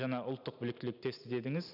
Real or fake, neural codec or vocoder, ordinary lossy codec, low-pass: real; none; none; 5.4 kHz